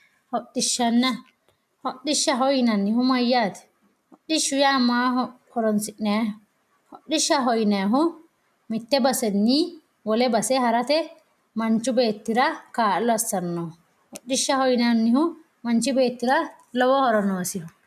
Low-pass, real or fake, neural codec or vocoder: 14.4 kHz; real; none